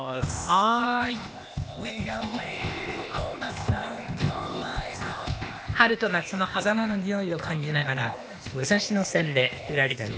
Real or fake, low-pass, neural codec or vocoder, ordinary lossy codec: fake; none; codec, 16 kHz, 0.8 kbps, ZipCodec; none